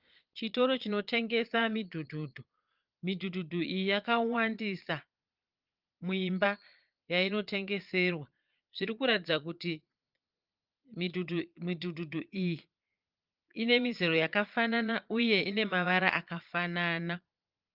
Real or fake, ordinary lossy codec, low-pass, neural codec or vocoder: fake; Opus, 32 kbps; 5.4 kHz; vocoder, 22.05 kHz, 80 mel bands, Vocos